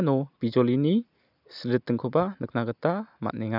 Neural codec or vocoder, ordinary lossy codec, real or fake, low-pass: none; none; real; 5.4 kHz